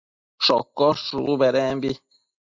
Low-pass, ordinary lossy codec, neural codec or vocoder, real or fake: 7.2 kHz; MP3, 48 kbps; none; real